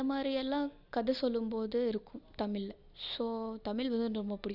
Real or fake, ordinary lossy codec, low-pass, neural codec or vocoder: real; Opus, 64 kbps; 5.4 kHz; none